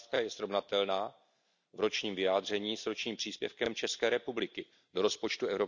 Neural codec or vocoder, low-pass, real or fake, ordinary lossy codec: none; 7.2 kHz; real; none